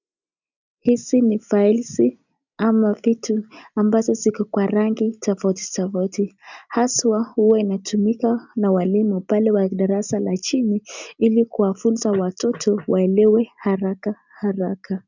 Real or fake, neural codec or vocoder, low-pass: real; none; 7.2 kHz